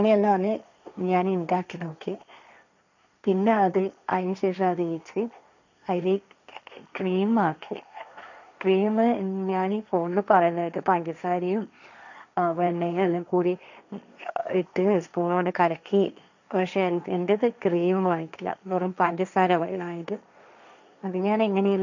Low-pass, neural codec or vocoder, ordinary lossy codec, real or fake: 7.2 kHz; codec, 16 kHz, 1.1 kbps, Voila-Tokenizer; none; fake